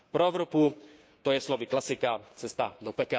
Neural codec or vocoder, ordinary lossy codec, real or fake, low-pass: codec, 16 kHz, 6 kbps, DAC; none; fake; none